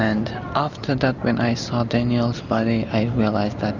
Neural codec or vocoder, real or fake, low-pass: none; real; 7.2 kHz